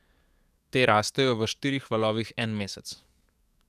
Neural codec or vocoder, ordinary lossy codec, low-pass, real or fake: codec, 44.1 kHz, 7.8 kbps, DAC; none; 14.4 kHz; fake